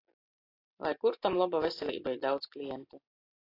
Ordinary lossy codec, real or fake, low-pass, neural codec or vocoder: AAC, 24 kbps; real; 5.4 kHz; none